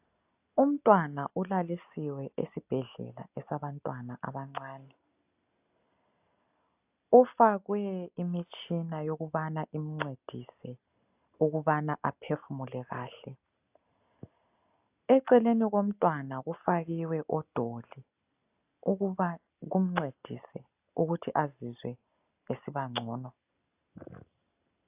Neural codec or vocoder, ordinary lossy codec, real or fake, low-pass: none; AAC, 32 kbps; real; 3.6 kHz